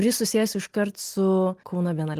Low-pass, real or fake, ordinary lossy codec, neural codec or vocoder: 14.4 kHz; real; Opus, 24 kbps; none